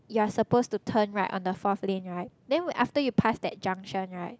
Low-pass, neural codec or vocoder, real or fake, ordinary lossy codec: none; none; real; none